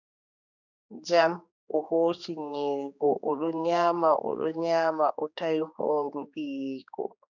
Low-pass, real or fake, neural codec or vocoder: 7.2 kHz; fake; codec, 16 kHz, 2 kbps, X-Codec, HuBERT features, trained on general audio